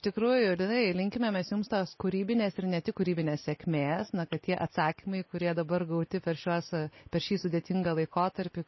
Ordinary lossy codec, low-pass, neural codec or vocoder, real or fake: MP3, 24 kbps; 7.2 kHz; none; real